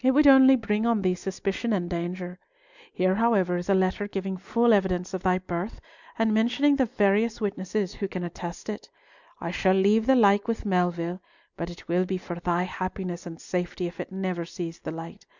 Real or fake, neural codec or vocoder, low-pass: real; none; 7.2 kHz